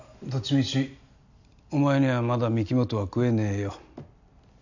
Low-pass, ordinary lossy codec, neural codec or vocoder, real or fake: 7.2 kHz; none; none; real